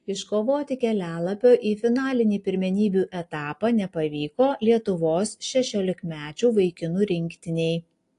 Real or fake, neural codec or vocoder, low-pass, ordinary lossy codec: real; none; 10.8 kHz; AAC, 48 kbps